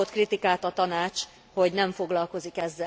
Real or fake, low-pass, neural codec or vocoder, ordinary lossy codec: real; none; none; none